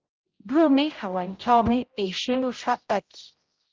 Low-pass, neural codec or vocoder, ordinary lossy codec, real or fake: 7.2 kHz; codec, 16 kHz, 0.5 kbps, X-Codec, HuBERT features, trained on general audio; Opus, 24 kbps; fake